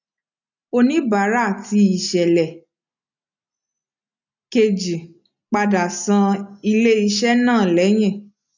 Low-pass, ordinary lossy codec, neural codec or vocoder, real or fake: 7.2 kHz; none; none; real